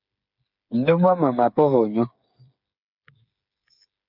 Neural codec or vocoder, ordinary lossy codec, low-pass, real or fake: codec, 16 kHz, 8 kbps, FreqCodec, smaller model; MP3, 48 kbps; 5.4 kHz; fake